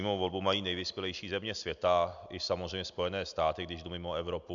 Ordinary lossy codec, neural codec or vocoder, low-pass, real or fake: MP3, 96 kbps; none; 7.2 kHz; real